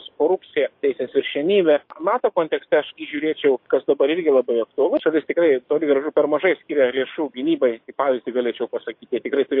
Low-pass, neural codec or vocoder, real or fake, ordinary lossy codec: 5.4 kHz; codec, 44.1 kHz, 7.8 kbps, DAC; fake; MP3, 32 kbps